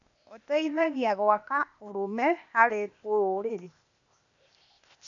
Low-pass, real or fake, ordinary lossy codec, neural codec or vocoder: 7.2 kHz; fake; none; codec, 16 kHz, 0.8 kbps, ZipCodec